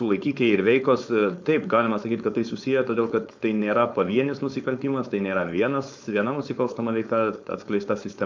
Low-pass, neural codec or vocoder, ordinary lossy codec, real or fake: 7.2 kHz; codec, 16 kHz, 4.8 kbps, FACodec; MP3, 64 kbps; fake